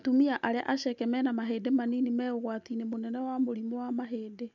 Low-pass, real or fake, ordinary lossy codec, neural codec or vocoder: 7.2 kHz; real; none; none